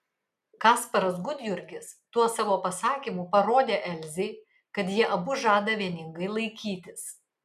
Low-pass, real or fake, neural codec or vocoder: 14.4 kHz; real; none